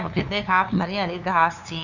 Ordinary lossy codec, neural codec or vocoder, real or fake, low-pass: none; codec, 16 kHz, 2 kbps, FunCodec, trained on LibriTTS, 25 frames a second; fake; 7.2 kHz